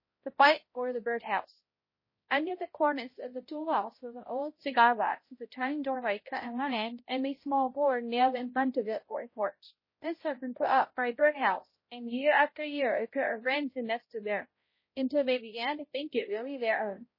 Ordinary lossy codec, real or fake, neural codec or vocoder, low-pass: MP3, 24 kbps; fake; codec, 16 kHz, 0.5 kbps, X-Codec, HuBERT features, trained on balanced general audio; 5.4 kHz